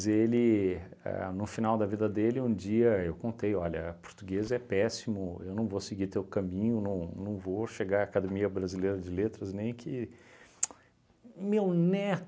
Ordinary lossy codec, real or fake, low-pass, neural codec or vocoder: none; real; none; none